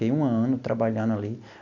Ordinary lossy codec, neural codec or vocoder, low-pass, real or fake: none; none; 7.2 kHz; real